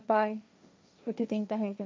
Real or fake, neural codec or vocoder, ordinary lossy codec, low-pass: fake; codec, 16 kHz, 1.1 kbps, Voila-Tokenizer; none; none